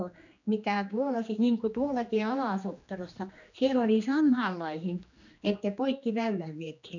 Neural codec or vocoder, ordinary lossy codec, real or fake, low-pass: codec, 16 kHz, 2 kbps, X-Codec, HuBERT features, trained on general audio; none; fake; 7.2 kHz